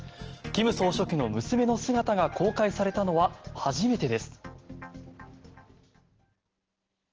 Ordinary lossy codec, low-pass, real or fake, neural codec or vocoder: Opus, 16 kbps; 7.2 kHz; real; none